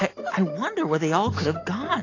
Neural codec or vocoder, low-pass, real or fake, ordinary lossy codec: none; 7.2 kHz; real; AAC, 32 kbps